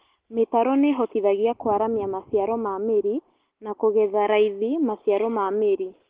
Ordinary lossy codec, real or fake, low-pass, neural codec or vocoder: Opus, 16 kbps; real; 3.6 kHz; none